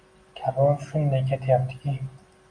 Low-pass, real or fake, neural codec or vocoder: 9.9 kHz; real; none